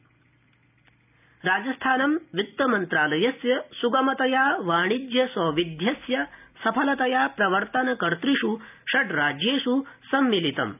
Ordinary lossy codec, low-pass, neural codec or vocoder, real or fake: none; 3.6 kHz; none; real